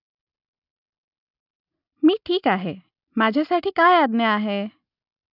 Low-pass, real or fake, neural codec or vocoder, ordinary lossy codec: 5.4 kHz; real; none; none